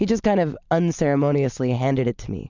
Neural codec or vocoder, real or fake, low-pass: none; real; 7.2 kHz